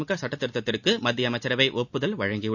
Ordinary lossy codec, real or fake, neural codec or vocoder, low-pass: none; real; none; 7.2 kHz